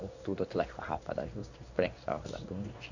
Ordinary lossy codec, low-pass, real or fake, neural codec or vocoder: none; 7.2 kHz; fake; codec, 16 kHz in and 24 kHz out, 1 kbps, XY-Tokenizer